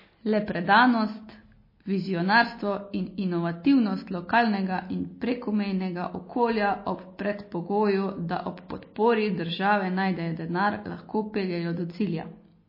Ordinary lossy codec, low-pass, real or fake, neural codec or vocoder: MP3, 24 kbps; 5.4 kHz; real; none